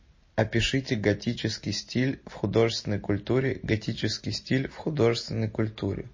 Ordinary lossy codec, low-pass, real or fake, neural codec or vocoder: MP3, 32 kbps; 7.2 kHz; real; none